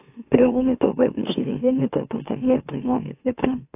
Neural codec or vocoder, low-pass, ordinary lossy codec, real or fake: autoencoder, 44.1 kHz, a latent of 192 numbers a frame, MeloTTS; 3.6 kHz; AAC, 32 kbps; fake